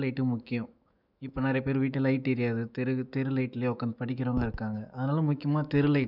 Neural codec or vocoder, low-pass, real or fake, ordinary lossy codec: autoencoder, 48 kHz, 128 numbers a frame, DAC-VAE, trained on Japanese speech; 5.4 kHz; fake; none